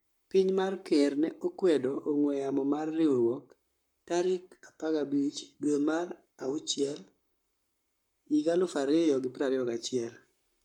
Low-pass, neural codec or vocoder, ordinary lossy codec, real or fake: 19.8 kHz; codec, 44.1 kHz, 7.8 kbps, Pupu-Codec; MP3, 96 kbps; fake